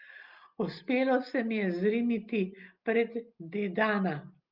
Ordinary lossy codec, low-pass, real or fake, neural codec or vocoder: Opus, 32 kbps; 5.4 kHz; real; none